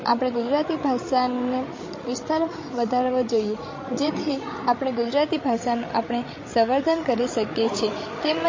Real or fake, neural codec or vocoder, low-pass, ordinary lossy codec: fake; codec, 16 kHz, 16 kbps, FreqCodec, larger model; 7.2 kHz; MP3, 32 kbps